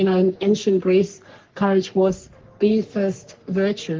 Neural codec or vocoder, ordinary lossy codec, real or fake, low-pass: codec, 44.1 kHz, 3.4 kbps, Pupu-Codec; Opus, 16 kbps; fake; 7.2 kHz